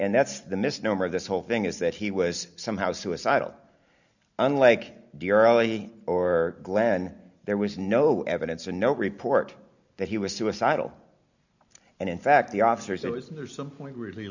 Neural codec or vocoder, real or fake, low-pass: none; real; 7.2 kHz